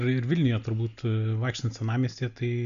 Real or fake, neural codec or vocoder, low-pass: real; none; 7.2 kHz